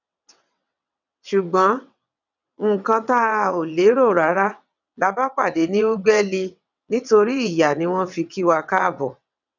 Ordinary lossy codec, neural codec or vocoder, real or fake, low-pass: none; vocoder, 22.05 kHz, 80 mel bands, WaveNeXt; fake; 7.2 kHz